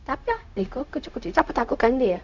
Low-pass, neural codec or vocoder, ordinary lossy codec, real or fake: 7.2 kHz; codec, 16 kHz, 0.4 kbps, LongCat-Audio-Codec; none; fake